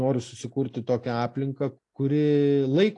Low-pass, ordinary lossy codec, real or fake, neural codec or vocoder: 10.8 kHz; AAC, 48 kbps; real; none